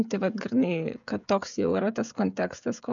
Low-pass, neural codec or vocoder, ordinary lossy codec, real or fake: 7.2 kHz; codec, 16 kHz, 16 kbps, FreqCodec, smaller model; MP3, 96 kbps; fake